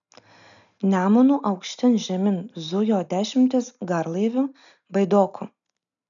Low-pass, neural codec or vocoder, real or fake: 7.2 kHz; none; real